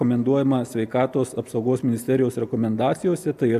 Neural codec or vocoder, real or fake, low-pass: none; real; 14.4 kHz